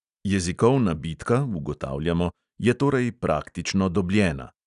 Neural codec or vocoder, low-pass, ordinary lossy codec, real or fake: none; 10.8 kHz; none; real